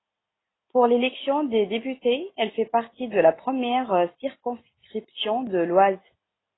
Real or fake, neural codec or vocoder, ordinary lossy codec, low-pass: real; none; AAC, 16 kbps; 7.2 kHz